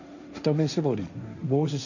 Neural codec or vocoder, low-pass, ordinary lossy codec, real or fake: codec, 16 kHz, 1.1 kbps, Voila-Tokenizer; 7.2 kHz; none; fake